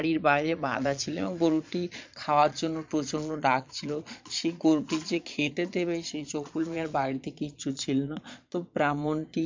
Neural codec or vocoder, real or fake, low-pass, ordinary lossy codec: vocoder, 22.05 kHz, 80 mel bands, Vocos; fake; 7.2 kHz; MP3, 64 kbps